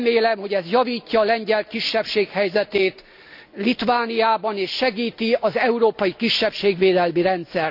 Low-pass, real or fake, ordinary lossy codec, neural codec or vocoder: 5.4 kHz; real; AAC, 48 kbps; none